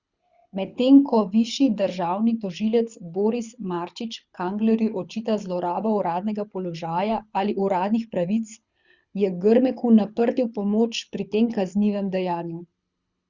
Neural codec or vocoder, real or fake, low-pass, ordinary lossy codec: codec, 24 kHz, 6 kbps, HILCodec; fake; 7.2 kHz; Opus, 64 kbps